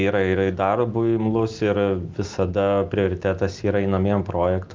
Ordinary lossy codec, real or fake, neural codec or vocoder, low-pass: Opus, 32 kbps; real; none; 7.2 kHz